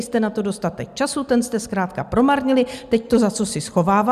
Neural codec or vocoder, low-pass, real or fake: none; 14.4 kHz; real